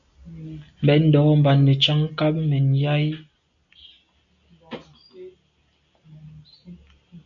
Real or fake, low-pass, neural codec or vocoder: real; 7.2 kHz; none